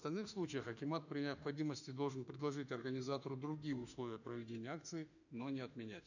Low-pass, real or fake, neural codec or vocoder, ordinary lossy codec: 7.2 kHz; fake; autoencoder, 48 kHz, 32 numbers a frame, DAC-VAE, trained on Japanese speech; none